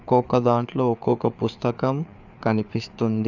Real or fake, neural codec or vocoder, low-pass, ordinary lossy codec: fake; codec, 16 kHz, 4 kbps, FreqCodec, larger model; 7.2 kHz; none